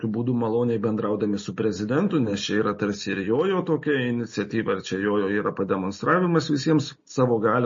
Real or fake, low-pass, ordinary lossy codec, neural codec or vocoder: real; 7.2 kHz; MP3, 32 kbps; none